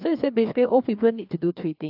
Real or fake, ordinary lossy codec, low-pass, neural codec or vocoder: fake; AAC, 48 kbps; 5.4 kHz; codec, 16 kHz, 2 kbps, FreqCodec, larger model